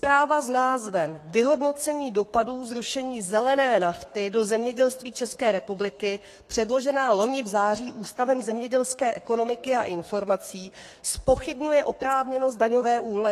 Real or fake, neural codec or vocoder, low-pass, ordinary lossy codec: fake; codec, 32 kHz, 1.9 kbps, SNAC; 14.4 kHz; AAC, 48 kbps